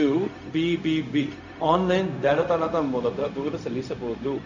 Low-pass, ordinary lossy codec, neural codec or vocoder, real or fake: 7.2 kHz; none; codec, 16 kHz, 0.4 kbps, LongCat-Audio-Codec; fake